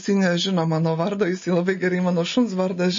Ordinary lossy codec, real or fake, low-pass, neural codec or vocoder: MP3, 32 kbps; real; 7.2 kHz; none